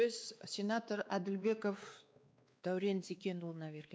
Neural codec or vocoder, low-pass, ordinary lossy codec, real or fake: codec, 16 kHz, 2 kbps, X-Codec, WavLM features, trained on Multilingual LibriSpeech; none; none; fake